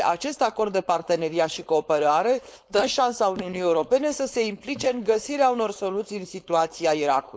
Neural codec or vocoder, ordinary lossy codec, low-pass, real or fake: codec, 16 kHz, 4.8 kbps, FACodec; none; none; fake